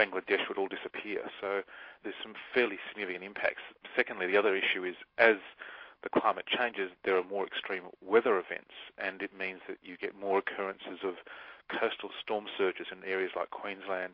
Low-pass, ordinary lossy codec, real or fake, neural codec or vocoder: 5.4 kHz; MP3, 32 kbps; real; none